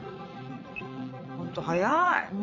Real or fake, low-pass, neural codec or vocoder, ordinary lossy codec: fake; 7.2 kHz; vocoder, 22.05 kHz, 80 mel bands, Vocos; none